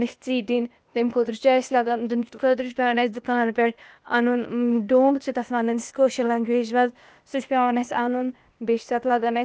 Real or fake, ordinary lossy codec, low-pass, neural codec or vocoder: fake; none; none; codec, 16 kHz, 0.8 kbps, ZipCodec